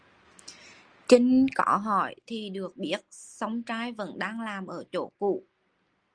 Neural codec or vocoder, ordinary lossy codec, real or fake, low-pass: none; Opus, 24 kbps; real; 9.9 kHz